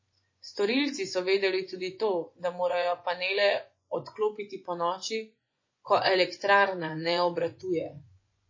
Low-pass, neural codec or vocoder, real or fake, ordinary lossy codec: 7.2 kHz; vocoder, 24 kHz, 100 mel bands, Vocos; fake; MP3, 32 kbps